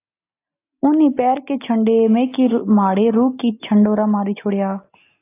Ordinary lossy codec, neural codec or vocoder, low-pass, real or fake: AAC, 24 kbps; none; 3.6 kHz; real